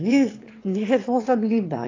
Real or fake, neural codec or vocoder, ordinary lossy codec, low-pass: fake; autoencoder, 22.05 kHz, a latent of 192 numbers a frame, VITS, trained on one speaker; AAC, 32 kbps; 7.2 kHz